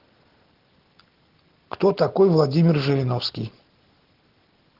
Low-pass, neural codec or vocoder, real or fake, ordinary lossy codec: 5.4 kHz; none; real; Opus, 16 kbps